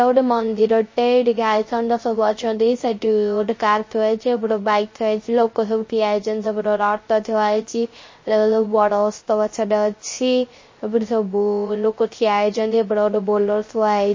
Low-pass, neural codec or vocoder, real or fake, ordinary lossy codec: 7.2 kHz; codec, 16 kHz, 0.3 kbps, FocalCodec; fake; MP3, 32 kbps